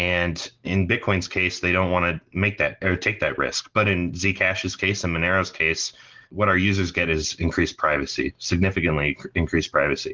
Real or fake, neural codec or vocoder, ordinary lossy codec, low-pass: real; none; Opus, 16 kbps; 7.2 kHz